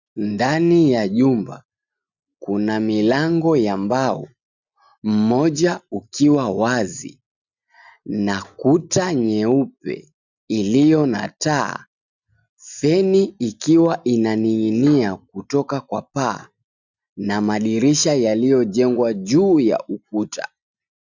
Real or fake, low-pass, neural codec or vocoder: real; 7.2 kHz; none